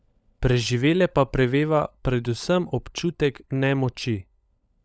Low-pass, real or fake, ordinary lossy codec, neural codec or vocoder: none; fake; none; codec, 16 kHz, 16 kbps, FunCodec, trained on LibriTTS, 50 frames a second